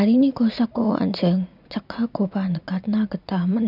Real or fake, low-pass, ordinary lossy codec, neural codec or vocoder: fake; 5.4 kHz; none; vocoder, 44.1 kHz, 80 mel bands, Vocos